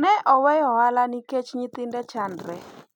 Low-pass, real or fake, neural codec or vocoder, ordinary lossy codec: 19.8 kHz; real; none; none